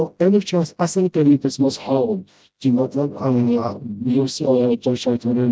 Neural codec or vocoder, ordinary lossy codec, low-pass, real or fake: codec, 16 kHz, 0.5 kbps, FreqCodec, smaller model; none; none; fake